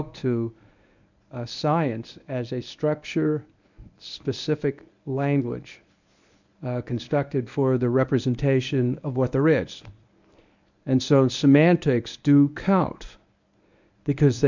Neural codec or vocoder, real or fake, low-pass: codec, 24 kHz, 0.9 kbps, WavTokenizer, medium speech release version 1; fake; 7.2 kHz